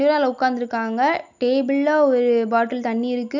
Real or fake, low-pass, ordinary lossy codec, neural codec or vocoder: real; 7.2 kHz; none; none